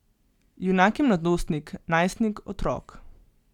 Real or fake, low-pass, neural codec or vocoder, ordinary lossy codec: real; 19.8 kHz; none; none